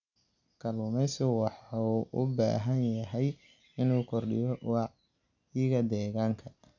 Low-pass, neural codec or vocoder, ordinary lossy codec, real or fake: 7.2 kHz; none; none; real